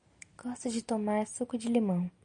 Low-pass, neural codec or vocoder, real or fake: 9.9 kHz; none; real